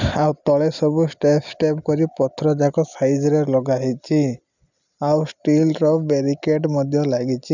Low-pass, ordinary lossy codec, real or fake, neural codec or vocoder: 7.2 kHz; none; real; none